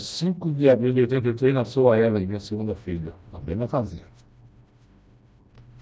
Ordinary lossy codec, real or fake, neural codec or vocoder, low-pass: none; fake; codec, 16 kHz, 1 kbps, FreqCodec, smaller model; none